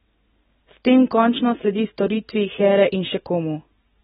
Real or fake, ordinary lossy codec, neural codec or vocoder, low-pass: real; AAC, 16 kbps; none; 19.8 kHz